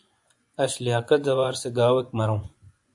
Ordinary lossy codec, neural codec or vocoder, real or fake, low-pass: AAC, 64 kbps; vocoder, 24 kHz, 100 mel bands, Vocos; fake; 10.8 kHz